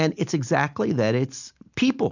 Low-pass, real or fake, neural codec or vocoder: 7.2 kHz; real; none